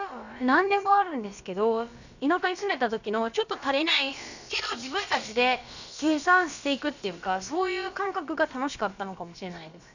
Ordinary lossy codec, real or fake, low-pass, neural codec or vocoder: none; fake; 7.2 kHz; codec, 16 kHz, about 1 kbps, DyCAST, with the encoder's durations